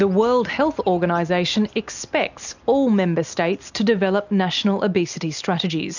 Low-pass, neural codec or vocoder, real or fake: 7.2 kHz; none; real